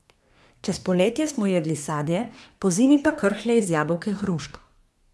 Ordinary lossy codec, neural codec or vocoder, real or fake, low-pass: none; codec, 24 kHz, 1 kbps, SNAC; fake; none